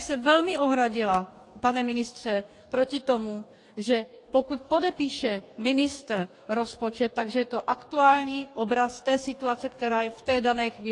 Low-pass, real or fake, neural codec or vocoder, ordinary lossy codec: 10.8 kHz; fake; codec, 44.1 kHz, 2.6 kbps, DAC; AAC, 48 kbps